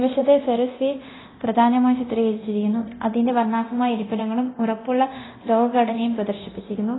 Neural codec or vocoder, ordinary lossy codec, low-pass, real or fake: codec, 24 kHz, 0.9 kbps, DualCodec; AAC, 16 kbps; 7.2 kHz; fake